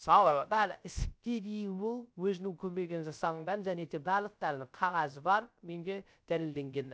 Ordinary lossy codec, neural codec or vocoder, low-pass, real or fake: none; codec, 16 kHz, 0.3 kbps, FocalCodec; none; fake